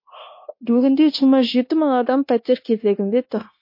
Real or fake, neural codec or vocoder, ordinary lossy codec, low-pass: fake; codec, 16 kHz, 0.9 kbps, LongCat-Audio-Codec; MP3, 32 kbps; 5.4 kHz